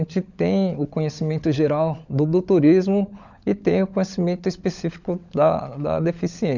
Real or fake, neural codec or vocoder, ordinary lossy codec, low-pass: fake; codec, 16 kHz, 16 kbps, FunCodec, trained on LibriTTS, 50 frames a second; none; 7.2 kHz